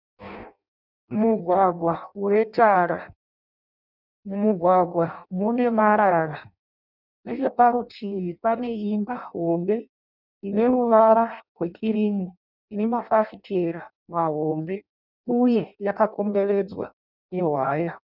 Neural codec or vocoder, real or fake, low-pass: codec, 16 kHz in and 24 kHz out, 0.6 kbps, FireRedTTS-2 codec; fake; 5.4 kHz